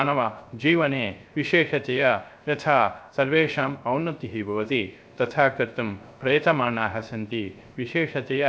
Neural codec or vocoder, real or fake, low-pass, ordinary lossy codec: codec, 16 kHz, 0.3 kbps, FocalCodec; fake; none; none